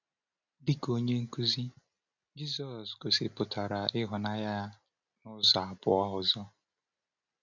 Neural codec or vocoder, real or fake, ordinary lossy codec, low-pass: none; real; none; 7.2 kHz